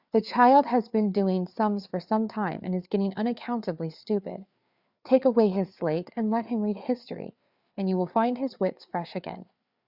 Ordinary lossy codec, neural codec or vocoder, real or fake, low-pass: Opus, 64 kbps; codec, 16 kHz, 4 kbps, FreqCodec, larger model; fake; 5.4 kHz